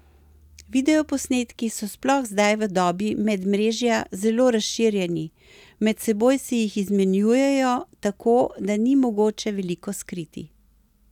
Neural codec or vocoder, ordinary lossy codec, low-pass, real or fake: none; none; 19.8 kHz; real